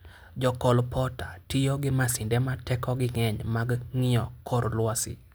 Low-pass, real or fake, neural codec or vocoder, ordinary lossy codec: none; real; none; none